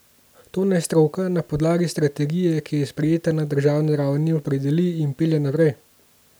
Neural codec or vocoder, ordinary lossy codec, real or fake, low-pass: none; none; real; none